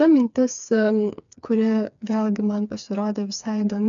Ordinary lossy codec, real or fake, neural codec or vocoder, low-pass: MP3, 96 kbps; fake; codec, 16 kHz, 4 kbps, FreqCodec, smaller model; 7.2 kHz